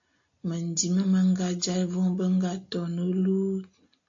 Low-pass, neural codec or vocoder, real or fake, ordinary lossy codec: 7.2 kHz; none; real; AAC, 48 kbps